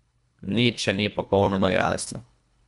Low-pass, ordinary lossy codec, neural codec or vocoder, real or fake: 10.8 kHz; none; codec, 24 kHz, 1.5 kbps, HILCodec; fake